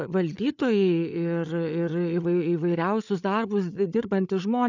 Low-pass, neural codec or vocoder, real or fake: 7.2 kHz; codec, 16 kHz, 8 kbps, FreqCodec, larger model; fake